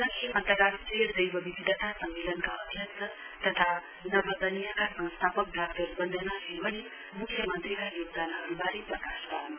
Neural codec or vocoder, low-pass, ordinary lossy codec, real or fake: none; 3.6 kHz; none; real